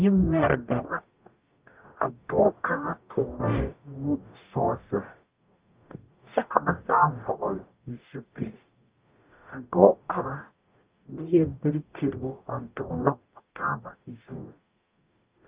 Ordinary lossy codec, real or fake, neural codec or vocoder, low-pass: Opus, 32 kbps; fake; codec, 44.1 kHz, 0.9 kbps, DAC; 3.6 kHz